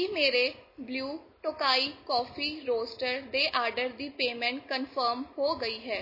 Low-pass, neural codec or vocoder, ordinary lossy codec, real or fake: 5.4 kHz; none; MP3, 24 kbps; real